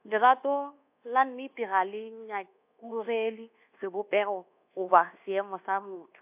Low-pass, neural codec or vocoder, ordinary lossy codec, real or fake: 3.6 kHz; codec, 24 kHz, 1.2 kbps, DualCodec; AAC, 32 kbps; fake